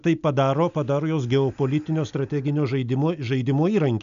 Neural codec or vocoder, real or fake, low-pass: none; real; 7.2 kHz